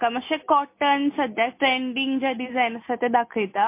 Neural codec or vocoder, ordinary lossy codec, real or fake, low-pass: none; MP3, 24 kbps; real; 3.6 kHz